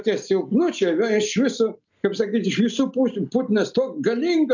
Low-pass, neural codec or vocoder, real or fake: 7.2 kHz; none; real